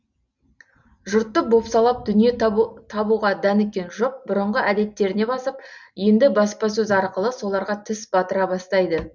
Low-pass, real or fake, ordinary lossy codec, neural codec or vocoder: 7.2 kHz; real; none; none